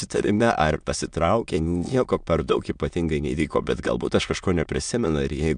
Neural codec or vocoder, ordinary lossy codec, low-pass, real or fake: autoencoder, 22.05 kHz, a latent of 192 numbers a frame, VITS, trained on many speakers; MP3, 96 kbps; 9.9 kHz; fake